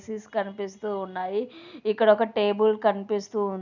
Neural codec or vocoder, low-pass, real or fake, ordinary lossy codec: none; 7.2 kHz; real; none